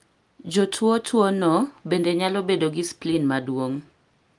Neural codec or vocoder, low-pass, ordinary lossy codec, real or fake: none; 10.8 kHz; Opus, 32 kbps; real